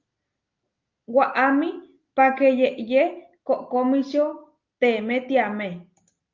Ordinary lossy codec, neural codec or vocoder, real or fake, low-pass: Opus, 24 kbps; none; real; 7.2 kHz